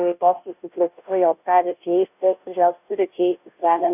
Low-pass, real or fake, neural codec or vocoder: 3.6 kHz; fake; codec, 16 kHz, 0.5 kbps, FunCodec, trained on Chinese and English, 25 frames a second